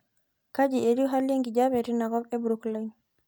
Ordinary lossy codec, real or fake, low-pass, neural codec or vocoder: none; real; none; none